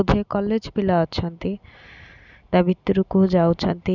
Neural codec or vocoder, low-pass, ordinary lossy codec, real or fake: none; 7.2 kHz; none; real